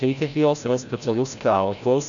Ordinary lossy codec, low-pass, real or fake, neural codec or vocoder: MP3, 96 kbps; 7.2 kHz; fake; codec, 16 kHz, 0.5 kbps, FreqCodec, larger model